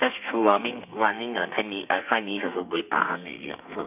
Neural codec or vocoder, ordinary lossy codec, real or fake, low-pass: codec, 32 kHz, 1.9 kbps, SNAC; none; fake; 3.6 kHz